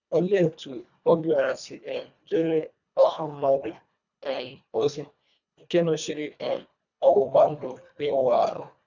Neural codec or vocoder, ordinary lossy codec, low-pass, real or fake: codec, 24 kHz, 1.5 kbps, HILCodec; none; 7.2 kHz; fake